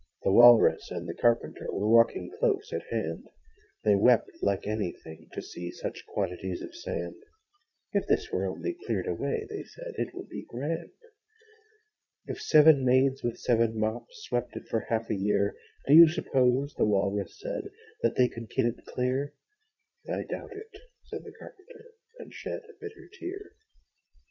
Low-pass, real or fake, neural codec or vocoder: 7.2 kHz; fake; vocoder, 22.05 kHz, 80 mel bands, Vocos